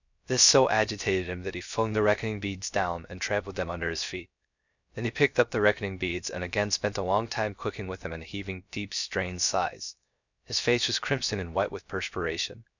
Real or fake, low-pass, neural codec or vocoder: fake; 7.2 kHz; codec, 16 kHz, 0.3 kbps, FocalCodec